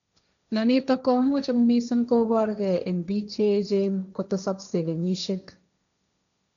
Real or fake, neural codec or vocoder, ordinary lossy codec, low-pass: fake; codec, 16 kHz, 1.1 kbps, Voila-Tokenizer; none; 7.2 kHz